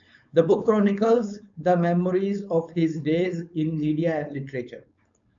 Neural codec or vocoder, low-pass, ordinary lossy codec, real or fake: codec, 16 kHz, 4.8 kbps, FACodec; 7.2 kHz; AAC, 64 kbps; fake